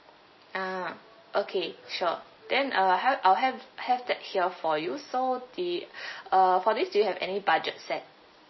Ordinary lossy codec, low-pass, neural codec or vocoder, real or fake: MP3, 24 kbps; 7.2 kHz; none; real